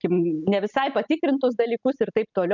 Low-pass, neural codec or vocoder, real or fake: 7.2 kHz; none; real